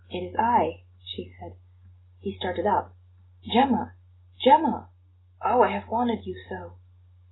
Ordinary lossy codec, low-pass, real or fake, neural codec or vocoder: AAC, 16 kbps; 7.2 kHz; real; none